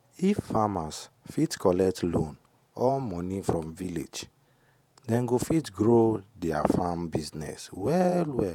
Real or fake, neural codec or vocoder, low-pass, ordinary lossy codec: fake; vocoder, 48 kHz, 128 mel bands, Vocos; 19.8 kHz; none